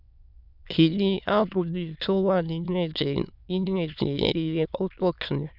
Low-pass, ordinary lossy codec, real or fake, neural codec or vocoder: 5.4 kHz; none; fake; autoencoder, 22.05 kHz, a latent of 192 numbers a frame, VITS, trained on many speakers